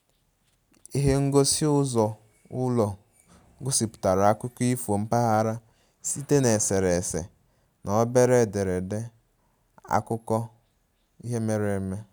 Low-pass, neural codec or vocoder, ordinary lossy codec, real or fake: none; none; none; real